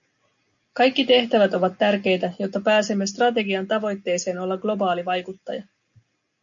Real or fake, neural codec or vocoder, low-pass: real; none; 7.2 kHz